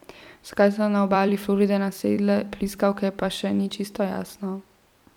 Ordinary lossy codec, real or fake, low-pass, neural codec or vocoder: MP3, 96 kbps; fake; 19.8 kHz; vocoder, 44.1 kHz, 128 mel bands every 256 samples, BigVGAN v2